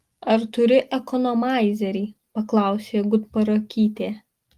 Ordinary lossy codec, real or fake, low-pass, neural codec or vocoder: Opus, 24 kbps; real; 14.4 kHz; none